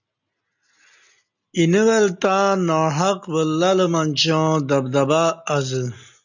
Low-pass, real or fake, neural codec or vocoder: 7.2 kHz; real; none